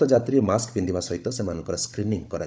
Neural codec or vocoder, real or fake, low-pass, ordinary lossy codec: codec, 16 kHz, 16 kbps, FunCodec, trained on Chinese and English, 50 frames a second; fake; none; none